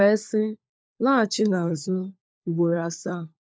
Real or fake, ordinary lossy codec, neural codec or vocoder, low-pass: fake; none; codec, 16 kHz, 4 kbps, FunCodec, trained on LibriTTS, 50 frames a second; none